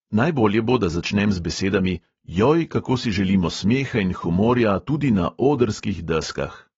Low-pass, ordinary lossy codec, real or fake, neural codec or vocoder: 7.2 kHz; AAC, 24 kbps; real; none